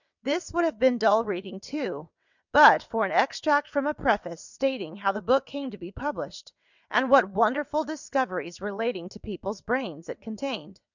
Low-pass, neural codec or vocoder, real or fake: 7.2 kHz; vocoder, 22.05 kHz, 80 mel bands, WaveNeXt; fake